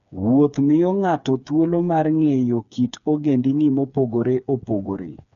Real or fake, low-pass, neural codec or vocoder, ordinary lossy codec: fake; 7.2 kHz; codec, 16 kHz, 4 kbps, FreqCodec, smaller model; none